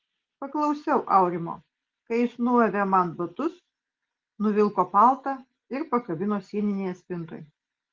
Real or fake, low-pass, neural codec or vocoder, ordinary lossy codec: real; 7.2 kHz; none; Opus, 16 kbps